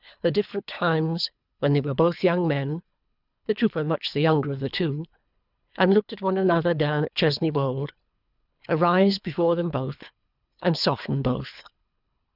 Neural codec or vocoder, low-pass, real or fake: codec, 24 kHz, 3 kbps, HILCodec; 5.4 kHz; fake